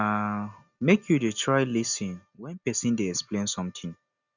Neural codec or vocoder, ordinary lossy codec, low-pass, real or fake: none; none; 7.2 kHz; real